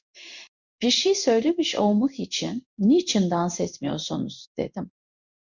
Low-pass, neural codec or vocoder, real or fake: 7.2 kHz; codec, 16 kHz in and 24 kHz out, 1 kbps, XY-Tokenizer; fake